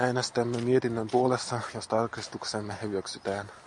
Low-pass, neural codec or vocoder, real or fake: 10.8 kHz; none; real